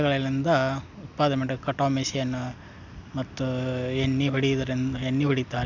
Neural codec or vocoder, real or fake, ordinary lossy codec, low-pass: none; real; none; 7.2 kHz